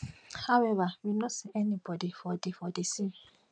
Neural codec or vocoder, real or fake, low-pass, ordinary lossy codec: none; real; 9.9 kHz; none